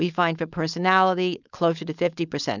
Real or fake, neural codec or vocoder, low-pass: fake; codec, 16 kHz, 4.8 kbps, FACodec; 7.2 kHz